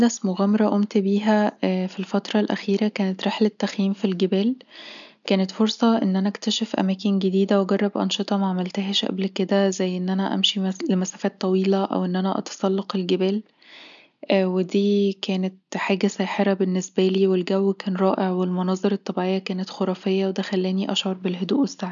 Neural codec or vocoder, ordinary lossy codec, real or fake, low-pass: none; none; real; 7.2 kHz